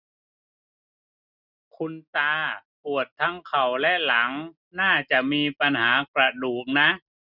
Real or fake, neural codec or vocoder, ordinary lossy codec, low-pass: real; none; none; 5.4 kHz